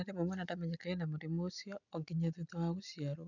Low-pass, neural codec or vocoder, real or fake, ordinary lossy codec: 7.2 kHz; none; real; none